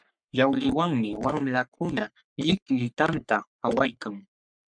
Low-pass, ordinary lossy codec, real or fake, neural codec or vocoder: 9.9 kHz; MP3, 96 kbps; fake; codec, 44.1 kHz, 3.4 kbps, Pupu-Codec